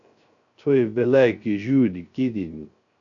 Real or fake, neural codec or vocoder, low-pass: fake; codec, 16 kHz, 0.3 kbps, FocalCodec; 7.2 kHz